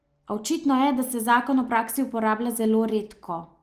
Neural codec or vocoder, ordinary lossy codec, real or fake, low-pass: none; Opus, 24 kbps; real; 14.4 kHz